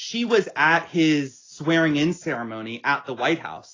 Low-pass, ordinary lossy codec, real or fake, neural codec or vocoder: 7.2 kHz; AAC, 32 kbps; real; none